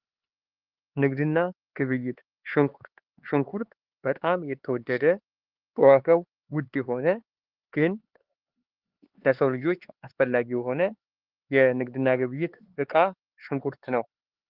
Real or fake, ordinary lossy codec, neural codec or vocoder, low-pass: fake; Opus, 16 kbps; codec, 16 kHz, 4 kbps, X-Codec, HuBERT features, trained on LibriSpeech; 5.4 kHz